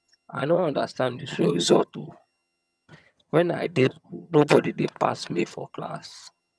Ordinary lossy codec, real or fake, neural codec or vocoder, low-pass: none; fake; vocoder, 22.05 kHz, 80 mel bands, HiFi-GAN; none